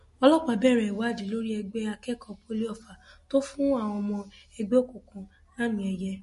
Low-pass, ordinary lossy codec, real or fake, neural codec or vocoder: 14.4 kHz; MP3, 48 kbps; real; none